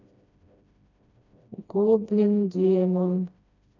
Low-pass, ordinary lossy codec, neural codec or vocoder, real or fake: 7.2 kHz; none; codec, 16 kHz, 1 kbps, FreqCodec, smaller model; fake